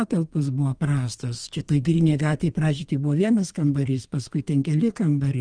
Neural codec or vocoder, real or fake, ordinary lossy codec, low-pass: codec, 32 kHz, 1.9 kbps, SNAC; fake; Opus, 24 kbps; 9.9 kHz